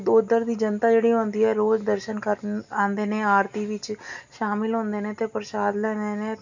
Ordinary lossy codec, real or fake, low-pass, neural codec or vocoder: none; real; 7.2 kHz; none